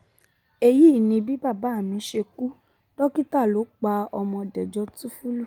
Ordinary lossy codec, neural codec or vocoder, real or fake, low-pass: Opus, 32 kbps; none; real; 19.8 kHz